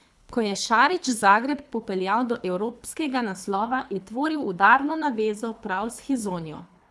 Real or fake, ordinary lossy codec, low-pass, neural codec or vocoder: fake; none; none; codec, 24 kHz, 3 kbps, HILCodec